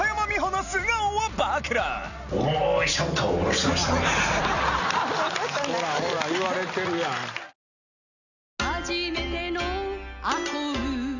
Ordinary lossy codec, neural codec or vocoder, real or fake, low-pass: none; none; real; 7.2 kHz